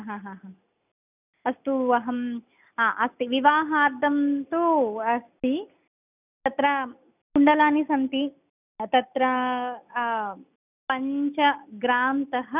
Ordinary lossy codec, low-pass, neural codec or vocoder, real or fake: none; 3.6 kHz; none; real